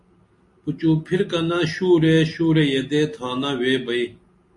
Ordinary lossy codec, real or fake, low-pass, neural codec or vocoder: MP3, 48 kbps; real; 10.8 kHz; none